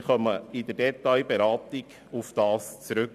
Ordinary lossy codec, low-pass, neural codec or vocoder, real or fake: none; 14.4 kHz; vocoder, 44.1 kHz, 128 mel bands every 512 samples, BigVGAN v2; fake